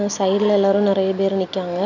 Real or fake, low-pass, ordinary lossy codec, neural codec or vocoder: real; 7.2 kHz; none; none